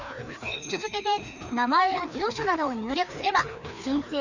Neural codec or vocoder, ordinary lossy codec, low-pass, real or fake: codec, 16 kHz, 2 kbps, FreqCodec, larger model; none; 7.2 kHz; fake